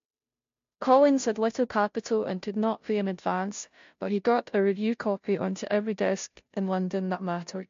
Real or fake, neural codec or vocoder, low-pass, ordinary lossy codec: fake; codec, 16 kHz, 0.5 kbps, FunCodec, trained on Chinese and English, 25 frames a second; 7.2 kHz; MP3, 48 kbps